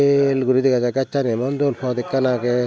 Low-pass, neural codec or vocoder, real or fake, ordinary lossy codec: none; none; real; none